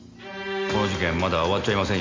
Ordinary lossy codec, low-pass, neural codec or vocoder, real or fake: MP3, 64 kbps; 7.2 kHz; none; real